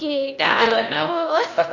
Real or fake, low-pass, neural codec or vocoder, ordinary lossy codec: fake; 7.2 kHz; codec, 16 kHz, 1 kbps, X-Codec, HuBERT features, trained on LibriSpeech; none